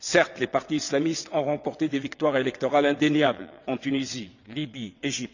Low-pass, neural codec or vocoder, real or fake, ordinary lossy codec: 7.2 kHz; vocoder, 22.05 kHz, 80 mel bands, WaveNeXt; fake; none